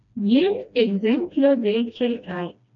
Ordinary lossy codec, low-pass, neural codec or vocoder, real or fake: MP3, 96 kbps; 7.2 kHz; codec, 16 kHz, 1 kbps, FreqCodec, smaller model; fake